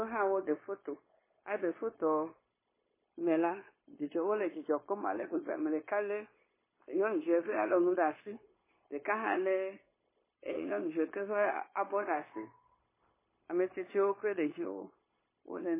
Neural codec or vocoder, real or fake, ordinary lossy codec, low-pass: codec, 16 kHz, 0.9 kbps, LongCat-Audio-Codec; fake; MP3, 16 kbps; 3.6 kHz